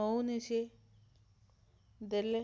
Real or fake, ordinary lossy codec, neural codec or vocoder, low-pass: real; none; none; none